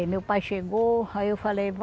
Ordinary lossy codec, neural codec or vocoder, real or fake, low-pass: none; none; real; none